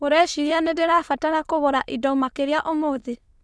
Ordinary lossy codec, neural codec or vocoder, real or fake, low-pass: none; autoencoder, 22.05 kHz, a latent of 192 numbers a frame, VITS, trained on many speakers; fake; none